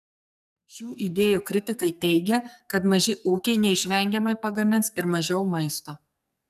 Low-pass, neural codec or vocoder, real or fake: 14.4 kHz; codec, 44.1 kHz, 2.6 kbps, SNAC; fake